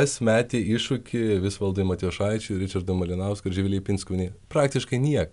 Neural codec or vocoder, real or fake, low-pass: none; real; 10.8 kHz